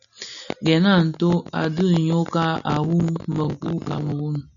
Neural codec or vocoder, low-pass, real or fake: none; 7.2 kHz; real